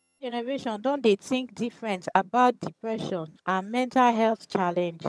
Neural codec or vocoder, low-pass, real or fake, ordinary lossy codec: vocoder, 22.05 kHz, 80 mel bands, HiFi-GAN; none; fake; none